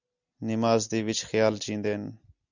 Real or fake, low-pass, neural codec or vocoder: real; 7.2 kHz; none